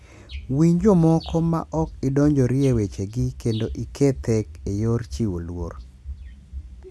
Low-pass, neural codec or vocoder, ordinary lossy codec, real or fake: none; none; none; real